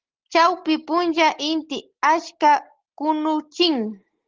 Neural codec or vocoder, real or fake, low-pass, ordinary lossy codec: none; real; 7.2 kHz; Opus, 16 kbps